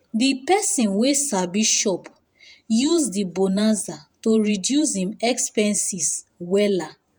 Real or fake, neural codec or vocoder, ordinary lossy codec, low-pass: fake; vocoder, 48 kHz, 128 mel bands, Vocos; none; none